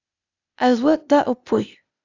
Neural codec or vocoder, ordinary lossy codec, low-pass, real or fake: codec, 16 kHz, 0.8 kbps, ZipCodec; AAC, 48 kbps; 7.2 kHz; fake